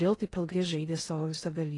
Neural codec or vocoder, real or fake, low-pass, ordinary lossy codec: codec, 16 kHz in and 24 kHz out, 0.6 kbps, FocalCodec, streaming, 2048 codes; fake; 10.8 kHz; AAC, 32 kbps